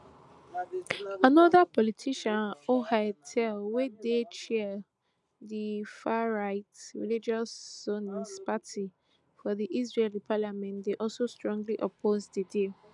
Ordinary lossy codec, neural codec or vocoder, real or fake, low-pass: none; none; real; 10.8 kHz